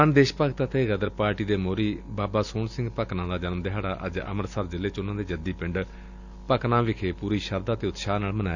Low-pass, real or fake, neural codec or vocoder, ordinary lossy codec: 7.2 kHz; real; none; none